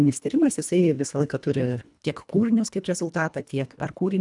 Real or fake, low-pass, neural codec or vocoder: fake; 10.8 kHz; codec, 24 kHz, 1.5 kbps, HILCodec